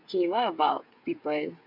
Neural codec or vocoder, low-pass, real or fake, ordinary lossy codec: codec, 16 kHz, 8 kbps, FreqCodec, smaller model; 5.4 kHz; fake; none